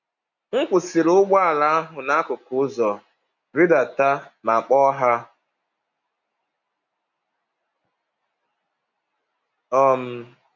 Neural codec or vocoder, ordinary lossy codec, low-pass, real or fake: none; none; 7.2 kHz; real